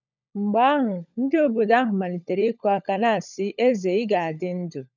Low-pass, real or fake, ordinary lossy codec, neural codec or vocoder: 7.2 kHz; fake; none; codec, 16 kHz, 16 kbps, FunCodec, trained on LibriTTS, 50 frames a second